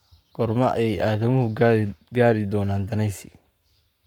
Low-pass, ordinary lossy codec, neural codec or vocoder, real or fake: 19.8 kHz; none; codec, 44.1 kHz, 7.8 kbps, Pupu-Codec; fake